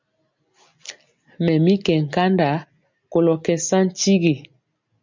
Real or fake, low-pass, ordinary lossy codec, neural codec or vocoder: real; 7.2 kHz; MP3, 64 kbps; none